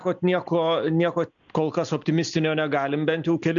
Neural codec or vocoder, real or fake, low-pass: none; real; 7.2 kHz